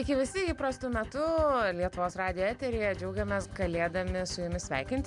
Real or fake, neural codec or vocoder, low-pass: real; none; 10.8 kHz